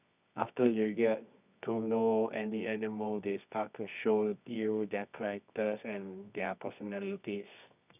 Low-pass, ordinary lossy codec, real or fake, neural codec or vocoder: 3.6 kHz; none; fake; codec, 24 kHz, 0.9 kbps, WavTokenizer, medium music audio release